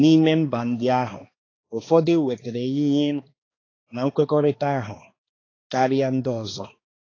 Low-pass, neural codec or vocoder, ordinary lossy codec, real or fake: 7.2 kHz; codec, 16 kHz, 2 kbps, X-Codec, HuBERT features, trained on balanced general audio; AAC, 32 kbps; fake